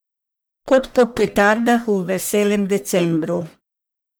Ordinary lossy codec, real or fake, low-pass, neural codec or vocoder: none; fake; none; codec, 44.1 kHz, 1.7 kbps, Pupu-Codec